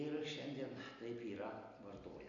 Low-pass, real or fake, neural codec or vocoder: 7.2 kHz; real; none